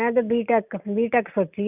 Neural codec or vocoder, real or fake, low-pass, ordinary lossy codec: none; real; 3.6 kHz; none